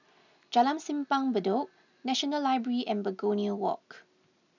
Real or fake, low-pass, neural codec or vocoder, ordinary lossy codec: real; 7.2 kHz; none; none